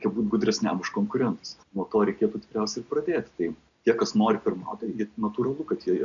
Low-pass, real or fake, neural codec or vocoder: 7.2 kHz; real; none